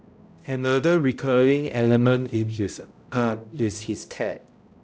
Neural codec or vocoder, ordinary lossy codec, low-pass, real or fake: codec, 16 kHz, 0.5 kbps, X-Codec, HuBERT features, trained on balanced general audio; none; none; fake